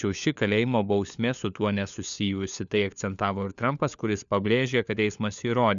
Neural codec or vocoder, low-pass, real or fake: codec, 16 kHz, 4 kbps, FunCodec, trained on LibriTTS, 50 frames a second; 7.2 kHz; fake